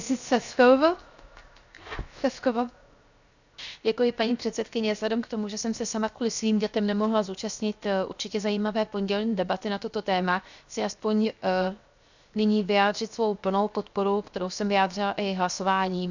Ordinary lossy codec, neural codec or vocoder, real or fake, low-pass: none; codec, 16 kHz, 0.7 kbps, FocalCodec; fake; 7.2 kHz